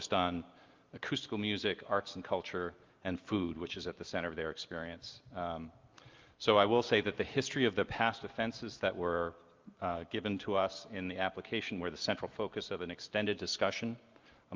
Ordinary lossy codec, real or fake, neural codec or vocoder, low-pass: Opus, 24 kbps; real; none; 7.2 kHz